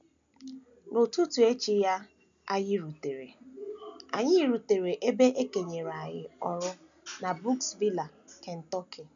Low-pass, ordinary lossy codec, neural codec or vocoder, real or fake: 7.2 kHz; MP3, 96 kbps; none; real